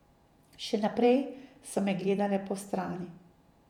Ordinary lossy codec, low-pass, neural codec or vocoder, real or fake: none; 19.8 kHz; vocoder, 48 kHz, 128 mel bands, Vocos; fake